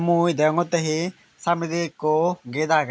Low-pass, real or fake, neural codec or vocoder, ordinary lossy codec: none; real; none; none